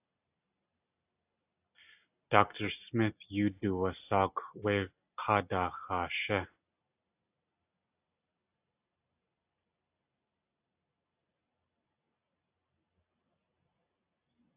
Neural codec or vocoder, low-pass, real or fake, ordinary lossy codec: none; 3.6 kHz; real; AAC, 32 kbps